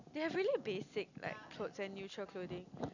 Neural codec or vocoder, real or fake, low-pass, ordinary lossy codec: none; real; 7.2 kHz; none